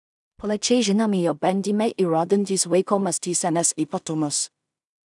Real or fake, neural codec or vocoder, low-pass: fake; codec, 16 kHz in and 24 kHz out, 0.4 kbps, LongCat-Audio-Codec, two codebook decoder; 10.8 kHz